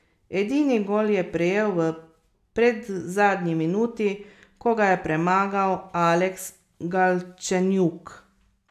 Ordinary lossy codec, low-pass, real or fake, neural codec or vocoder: none; 14.4 kHz; real; none